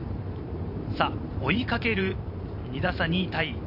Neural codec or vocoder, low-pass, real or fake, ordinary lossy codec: vocoder, 44.1 kHz, 128 mel bands every 512 samples, BigVGAN v2; 5.4 kHz; fake; none